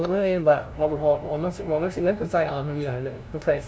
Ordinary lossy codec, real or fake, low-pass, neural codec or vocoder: none; fake; none; codec, 16 kHz, 0.5 kbps, FunCodec, trained on LibriTTS, 25 frames a second